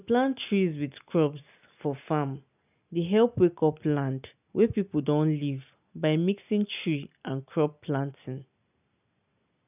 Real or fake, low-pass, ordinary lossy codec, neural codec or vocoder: real; 3.6 kHz; none; none